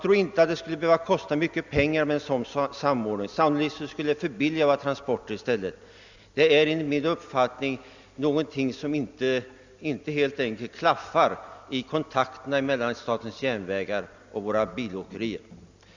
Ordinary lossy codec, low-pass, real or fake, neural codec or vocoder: Opus, 64 kbps; 7.2 kHz; real; none